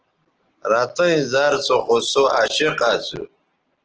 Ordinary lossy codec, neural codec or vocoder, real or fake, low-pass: Opus, 16 kbps; none; real; 7.2 kHz